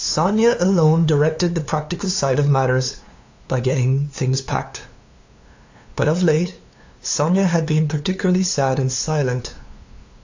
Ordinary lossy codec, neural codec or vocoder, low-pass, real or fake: AAC, 48 kbps; codec, 16 kHz, 2 kbps, FunCodec, trained on LibriTTS, 25 frames a second; 7.2 kHz; fake